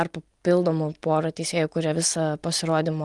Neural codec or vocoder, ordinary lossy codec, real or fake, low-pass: none; Opus, 24 kbps; real; 10.8 kHz